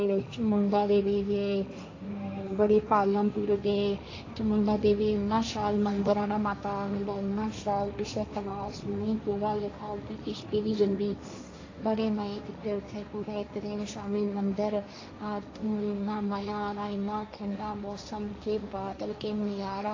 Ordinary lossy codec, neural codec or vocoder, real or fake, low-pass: AAC, 32 kbps; codec, 16 kHz, 1.1 kbps, Voila-Tokenizer; fake; 7.2 kHz